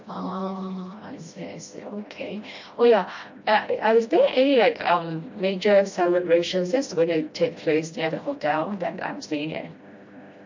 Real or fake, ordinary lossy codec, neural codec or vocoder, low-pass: fake; MP3, 48 kbps; codec, 16 kHz, 1 kbps, FreqCodec, smaller model; 7.2 kHz